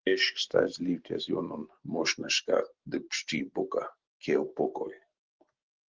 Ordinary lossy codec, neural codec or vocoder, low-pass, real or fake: Opus, 16 kbps; none; 7.2 kHz; real